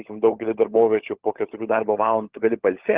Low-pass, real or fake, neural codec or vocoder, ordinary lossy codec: 3.6 kHz; fake; codec, 16 kHz, 16 kbps, FunCodec, trained on LibriTTS, 50 frames a second; Opus, 16 kbps